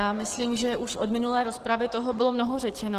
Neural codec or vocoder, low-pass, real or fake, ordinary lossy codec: codec, 44.1 kHz, 7.8 kbps, DAC; 14.4 kHz; fake; Opus, 16 kbps